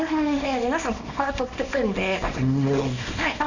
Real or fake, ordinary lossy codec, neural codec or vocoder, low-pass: fake; none; codec, 24 kHz, 0.9 kbps, WavTokenizer, small release; 7.2 kHz